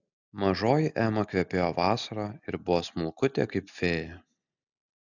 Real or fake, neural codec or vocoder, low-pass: real; none; 7.2 kHz